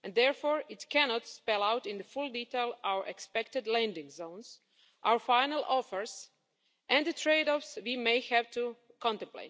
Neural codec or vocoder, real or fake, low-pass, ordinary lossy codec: none; real; none; none